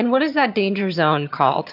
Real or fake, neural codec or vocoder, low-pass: fake; vocoder, 22.05 kHz, 80 mel bands, HiFi-GAN; 5.4 kHz